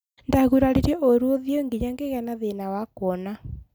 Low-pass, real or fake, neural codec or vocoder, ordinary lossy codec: none; real; none; none